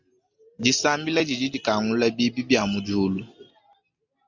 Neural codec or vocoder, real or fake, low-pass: none; real; 7.2 kHz